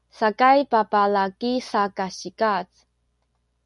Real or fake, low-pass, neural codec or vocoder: real; 10.8 kHz; none